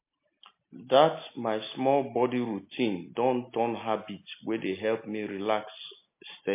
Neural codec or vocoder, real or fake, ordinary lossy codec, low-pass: none; real; MP3, 24 kbps; 3.6 kHz